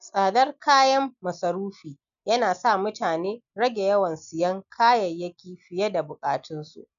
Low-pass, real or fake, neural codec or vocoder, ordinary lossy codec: 7.2 kHz; real; none; MP3, 96 kbps